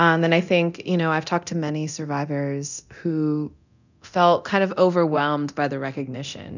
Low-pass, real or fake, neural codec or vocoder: 7.2 kHz; fake; codec, 24 kHz, 0.9 kbps, DualCodec